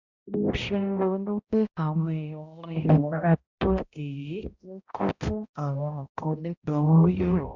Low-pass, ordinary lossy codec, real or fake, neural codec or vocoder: 7.2 kHz; Opus, 64 kbps; fake; codec, 16 kHz, 0.5 kbps, X-Codec, HuBERT features, trained on balanced general audio